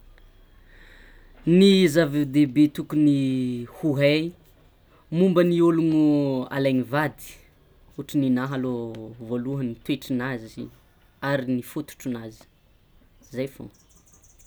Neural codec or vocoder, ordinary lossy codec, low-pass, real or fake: none; none; none; real